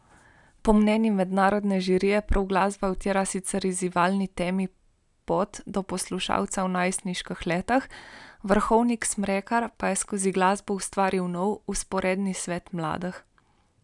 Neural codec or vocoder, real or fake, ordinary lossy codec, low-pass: none; real; none; 10.8 kHz